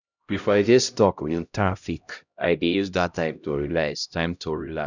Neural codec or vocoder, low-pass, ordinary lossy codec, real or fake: codec, 16 kHz, 0.5 kbps, X-Codec, HuBERT features, trained on LibriSpeech; 7.2 kHz; none; fake